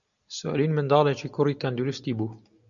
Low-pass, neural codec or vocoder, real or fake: 7.2 kHz; none; real